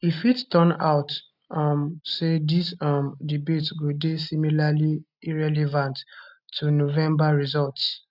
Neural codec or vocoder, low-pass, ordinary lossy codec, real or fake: none; 5.4 kHz; none; real